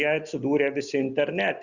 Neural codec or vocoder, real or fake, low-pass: none; real; 7.2 kHz